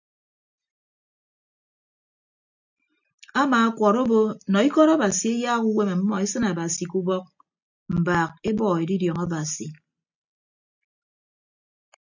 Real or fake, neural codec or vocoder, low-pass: real; none; 7.2 kHz